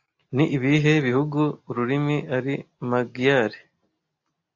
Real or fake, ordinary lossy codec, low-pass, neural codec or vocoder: real; AAC, 48 kbps; 7.2 kHz; none